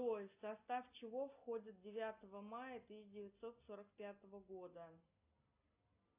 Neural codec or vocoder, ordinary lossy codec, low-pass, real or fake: none; AAC, 32 kbps; 3.6 kHz; real